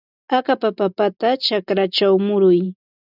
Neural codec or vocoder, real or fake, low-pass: none; real; 5.4 kHz